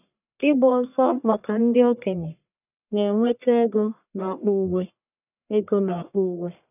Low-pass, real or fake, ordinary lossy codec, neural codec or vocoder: 3.6 kHz; fake; none; codec, 44.1 kHz, 1.7 kbps, Pupu-Codec